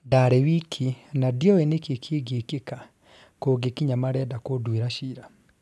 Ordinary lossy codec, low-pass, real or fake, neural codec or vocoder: none; none; real; none